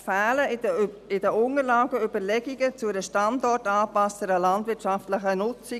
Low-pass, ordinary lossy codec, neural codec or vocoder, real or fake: 14.4 kHz; none; none; real